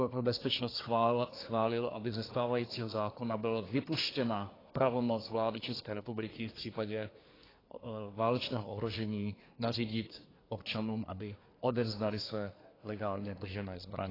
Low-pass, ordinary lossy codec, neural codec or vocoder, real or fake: 5.4 kHz; AAC, 24 kbps; codec, 24 kHz, 1 kbps, SNAC; fake